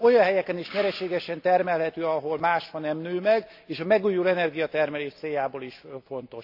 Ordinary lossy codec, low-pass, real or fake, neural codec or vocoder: AAC, 48 kbps; 5.4 kHz; real; none